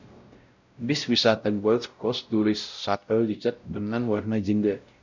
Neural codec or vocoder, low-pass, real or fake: codec, 16 kHz, 0.5 kbps, X-Codec, WavLM features, trained on Multilingual LibriSpeech; 7.2 kHz; fake